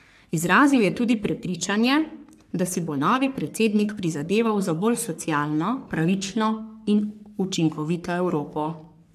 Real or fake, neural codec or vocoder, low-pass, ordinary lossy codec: fake; codec, 44.1 kHz, 3.4 kbps, Pupu-Codec; 14.4 kHz; none